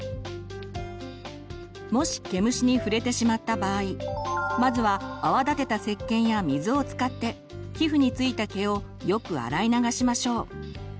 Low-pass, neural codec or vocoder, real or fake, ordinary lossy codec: none; none; real; none